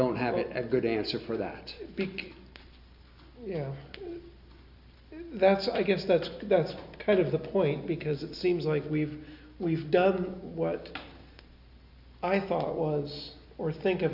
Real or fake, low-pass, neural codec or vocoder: real; 5.4 kHz; none